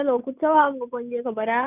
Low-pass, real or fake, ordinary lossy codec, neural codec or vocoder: 3.6 kHz; real; none; none